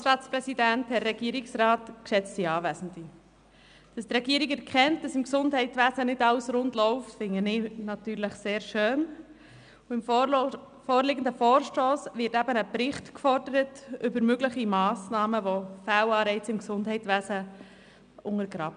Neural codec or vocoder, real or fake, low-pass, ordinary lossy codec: none; real; 9.9 kHz; none